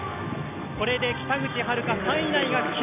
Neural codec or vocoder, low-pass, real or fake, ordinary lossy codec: none; 3.6 kHz; real; AAC, 32 kbps